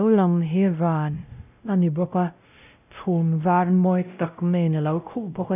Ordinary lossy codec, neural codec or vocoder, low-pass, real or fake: none; codec, 16 kHz, 0.5 kbps, X-Codec, WavLM features, trained on Multilingual LibriSpeech; 3.6 kHz; fake